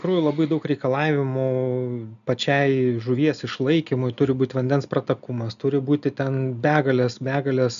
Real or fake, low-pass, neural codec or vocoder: real; 7.2 kHz; none